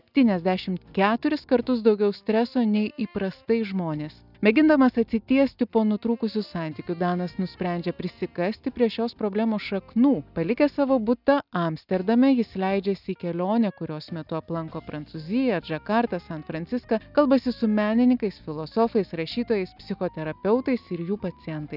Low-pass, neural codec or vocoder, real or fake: 5.4 kHz; none; real